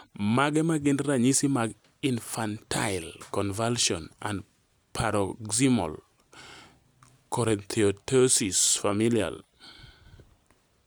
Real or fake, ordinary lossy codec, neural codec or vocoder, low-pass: real; none; none; none